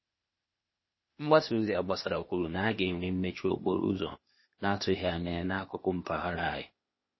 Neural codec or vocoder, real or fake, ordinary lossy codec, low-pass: codec, 16 kHz, 0.8 kbps, ZipCodec; fake; MP3, 24 kbps; 7.2 kHz